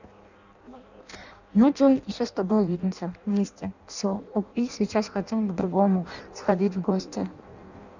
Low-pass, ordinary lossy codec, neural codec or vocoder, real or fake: 7.2 kHz; none; codec, 16 kHz in and 24 kHz out, 0.6 kbps, FireRedTTS-2 codec; fake